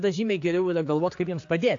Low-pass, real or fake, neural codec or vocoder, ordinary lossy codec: 7.2 kHz; fake; codec, 16 kHz, 4 kbps, X-Codec, HuBERT features, trained on general audio; AAC, 48 kbps